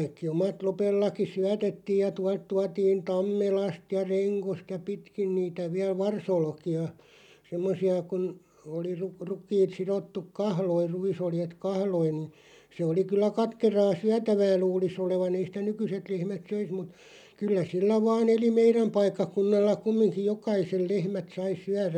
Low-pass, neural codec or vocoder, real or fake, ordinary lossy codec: 19.8 kHz; none; real; none